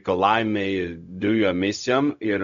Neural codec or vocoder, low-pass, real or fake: codec, 16 kHz, 0.4 kbps, LongCat-Audio-Codec; 7.2 kHz; fake